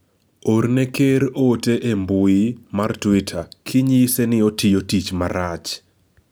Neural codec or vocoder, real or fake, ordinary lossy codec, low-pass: none; real; none; none